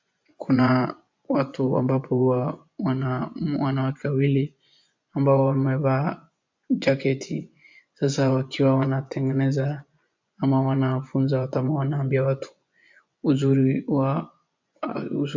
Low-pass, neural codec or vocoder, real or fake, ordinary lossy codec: 7.2 kHz; vocoder, 24 kHz, 100 mel bands, Vocos; fake; MP3, 64 kbps